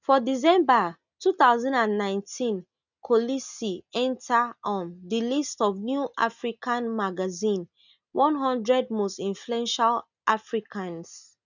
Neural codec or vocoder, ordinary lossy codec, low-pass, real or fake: none; none; 7.2 kHz; real